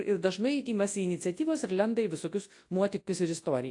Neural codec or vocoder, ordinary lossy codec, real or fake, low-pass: codec, 24 kHz, 0.9 kbps, WavTokenizer, large speech release; AAC, 48 kbps; fake; 10.8 kHz